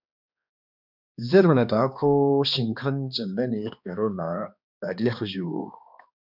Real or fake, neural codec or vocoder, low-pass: fake; codec, 16 kHz, 2 kbps, X-Codec, HuBERT features, trained on balanced general audio; 5.4 kHz